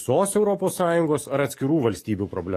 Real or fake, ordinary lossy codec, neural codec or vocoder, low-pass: fake; AAC, 48 kbps; codec, 44.1 kHz, 7.8 kbps, DAC; 14.4 kHz